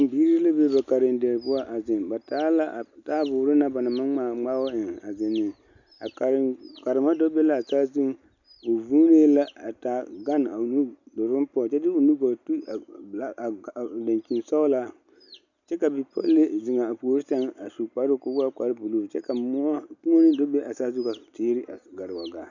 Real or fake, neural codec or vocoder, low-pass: real; none; 7.2 kHz